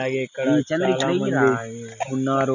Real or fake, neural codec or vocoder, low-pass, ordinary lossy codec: real; none; 7.2 kHz; none